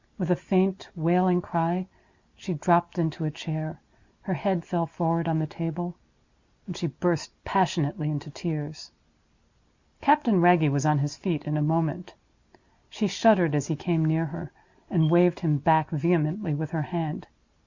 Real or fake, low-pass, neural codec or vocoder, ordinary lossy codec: real; 7.2 kHz; none; Opus, 64 kbps